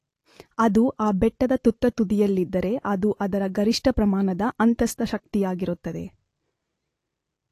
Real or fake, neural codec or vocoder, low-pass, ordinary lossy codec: real; none; 14.4 kHz; AAC, 48 kbps